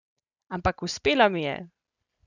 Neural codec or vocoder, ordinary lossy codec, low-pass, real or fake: none; none; 7.2 kHz; real